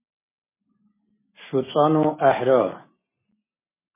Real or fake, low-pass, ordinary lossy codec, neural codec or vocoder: real; 3.6 kHz; MP3, 16 kbps; none